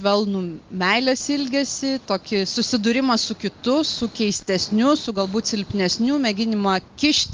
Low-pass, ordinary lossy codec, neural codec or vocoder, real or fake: 7.2 kHz; Opus, 32 kbps; none; real